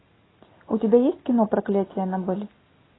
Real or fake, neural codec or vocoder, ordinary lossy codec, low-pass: real; none; AAC, 16 kbps; 7.2 kHz